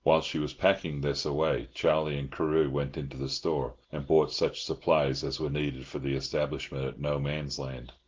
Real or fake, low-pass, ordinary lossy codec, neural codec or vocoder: real; 7.2 kHz; Opus, 16 kbps; none